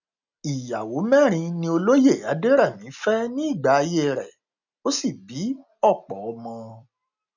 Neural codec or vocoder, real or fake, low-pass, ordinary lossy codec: none; real; 7.2 kHz; none